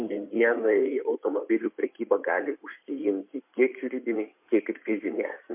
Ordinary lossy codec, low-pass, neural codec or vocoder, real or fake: MP3, 32 kbps; 3.6 kHz; codec, 16 kHz in and 24 kHz out, 2.2 kbps, FireRedTTS-2 codec; fake